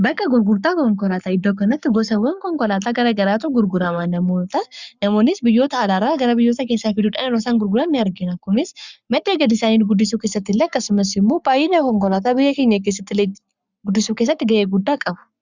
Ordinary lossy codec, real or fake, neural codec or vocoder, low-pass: Opus, 64 kbps; fake; codec, 44.1 kHz, 7.8 kbps, Pupu-Codec; 7.2 kHz